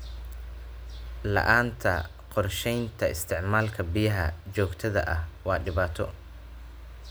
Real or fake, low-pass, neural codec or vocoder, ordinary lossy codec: real; none; none; none